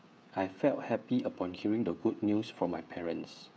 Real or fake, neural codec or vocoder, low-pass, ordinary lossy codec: fake; codec, 16 kHz, 16 kbps, FreqCodec, smaller model; none; none